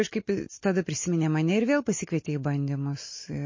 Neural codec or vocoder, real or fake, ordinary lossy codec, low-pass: none; real; MP3, 32 kbps; 7.2 kHz